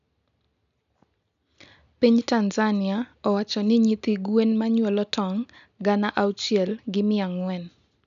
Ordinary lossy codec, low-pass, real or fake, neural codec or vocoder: none; 7.2 kHz; real; none